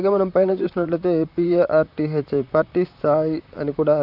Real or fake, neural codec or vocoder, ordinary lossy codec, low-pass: fake; vocoder, 44.1 kHz, 128 mel bands, Pupu-Vocoder; none; 5.4 kHz